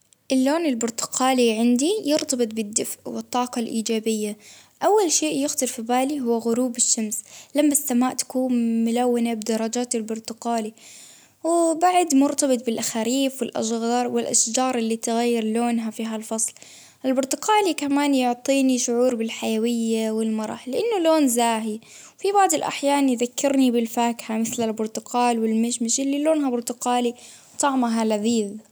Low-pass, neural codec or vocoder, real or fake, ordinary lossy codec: none; none; real; none